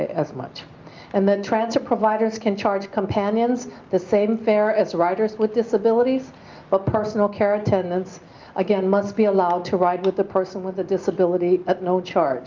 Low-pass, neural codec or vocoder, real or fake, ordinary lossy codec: 7.2 kHz; none; real; Opus, 24 kbps